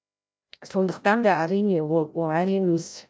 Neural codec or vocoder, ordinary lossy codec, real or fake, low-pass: codec, 16 kHz, 0.5 kbps, FreqCodec, larger model; none; fake; none